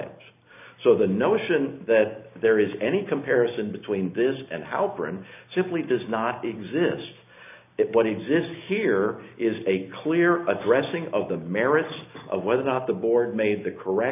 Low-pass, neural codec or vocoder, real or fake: 3.6 kHz; none; real